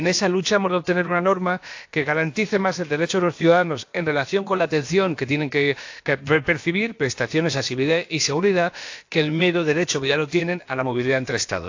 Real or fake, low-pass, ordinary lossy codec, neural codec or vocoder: fake; 7.2 kHz; AAC, 48 kbps; codec, 16 kHz, about 1 kbps, DyCAST, with the encoder's durations